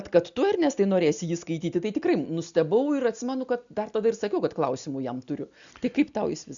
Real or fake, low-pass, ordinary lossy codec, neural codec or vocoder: real; 7.2 kHz; MP3, 96 kbps; none